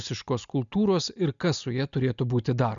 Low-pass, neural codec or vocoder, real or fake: 7.2 kHz; none; real